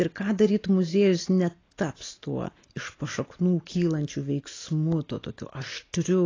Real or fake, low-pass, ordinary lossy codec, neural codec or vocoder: real; 7.2 kHz; AAC, 32 kbps; none